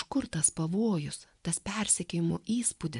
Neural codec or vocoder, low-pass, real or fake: vocoder, 24 kHz, 100 mel bands, Vocos; 10.8 kHz; fake